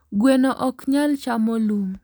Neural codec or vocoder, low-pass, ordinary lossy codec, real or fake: none; none; none; real